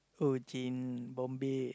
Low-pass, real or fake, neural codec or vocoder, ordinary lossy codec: none; real; none; none